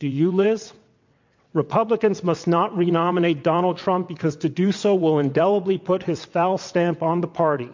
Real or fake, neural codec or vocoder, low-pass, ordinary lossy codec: fake; vocoder, 22.05 kHz, 80 mel bands, WaveNeXt; 7.2 kHz; MP3, 48 kbps